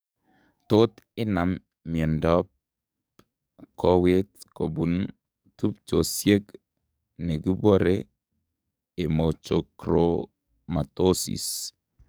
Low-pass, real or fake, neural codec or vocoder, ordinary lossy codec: none; fake; codec, 44.1 kHz, 7.8 kbps, DAC; none